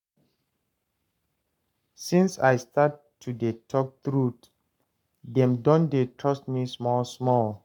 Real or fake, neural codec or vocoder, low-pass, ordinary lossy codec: fake; codec, 44.1 kHz, 7.8 kbps, Pupu-Codec; 19.8 kHz; none